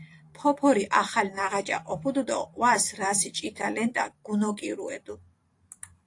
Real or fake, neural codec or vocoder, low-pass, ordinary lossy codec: fake; vocoder, 44.1 kHz, 128 mel bands every 512 samples, BigVGAN v2; 10.8 kHz; AAC, 48 kbps